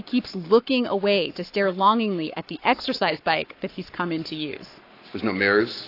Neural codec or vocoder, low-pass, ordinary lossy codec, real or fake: codec, 44.1 kHz, 7.8 kbps, Pupu-Codec; 5.4 kHz; AAC, 32 kbps; fake